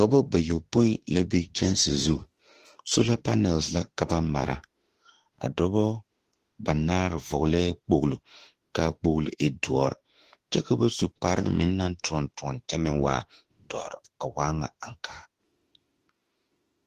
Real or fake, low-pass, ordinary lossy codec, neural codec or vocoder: fake; 14.4 kHz; Opus, 16 kbps; autoencoder, 48 kHz, 32 numbers a frame, DAC-VAE, trained on Japanese speech